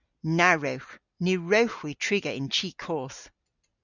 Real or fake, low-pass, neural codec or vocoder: real; 7.2 kHz; none